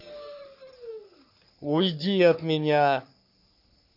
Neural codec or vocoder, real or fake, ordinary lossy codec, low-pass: codec, 44.1 kHz, 3.4 kbps, Pupu-Codec; fake; none; 5.4 kHz